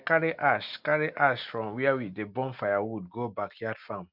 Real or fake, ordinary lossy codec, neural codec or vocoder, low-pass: real; none; none; 5.4 kHz